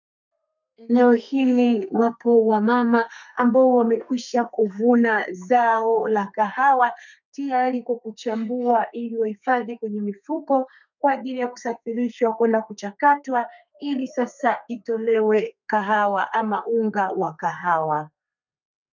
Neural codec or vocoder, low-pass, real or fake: codec, 32 kHz, 1.9 kbps, SNAC; 7.2 kHz; fake